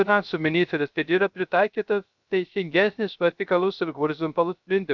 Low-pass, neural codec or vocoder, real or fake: 7.2 kHz; codec, 16 kHz, 0.3 kbps, FocalCodec; fake